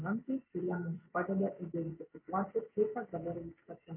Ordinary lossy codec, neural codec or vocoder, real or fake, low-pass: MP3, 24 kbps; none; real; 3.6 kHz